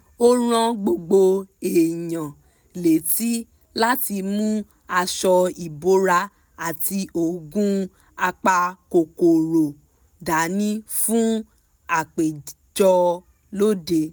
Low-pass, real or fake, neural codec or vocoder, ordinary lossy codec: none; real; none; none